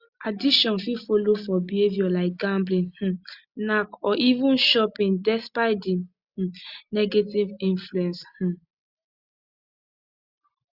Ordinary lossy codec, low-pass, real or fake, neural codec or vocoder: Opus, 64 kbps; 5.4 kHz; real; none